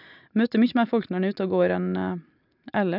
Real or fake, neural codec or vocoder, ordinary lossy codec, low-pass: real; none; none; 5.4 kHz